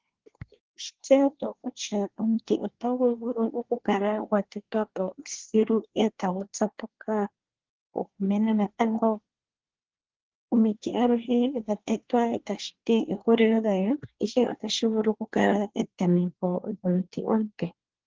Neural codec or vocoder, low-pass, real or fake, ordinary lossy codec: codec, 24 kHz, 1 kbps, SNAC; 7.2 kHz; fake; Opus, 16 kbps